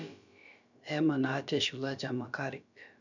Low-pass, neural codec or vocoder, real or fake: 7.2 kHz; codec, 16 kHz, about 1 kbps, DyCAST, with the encoder's durations; fake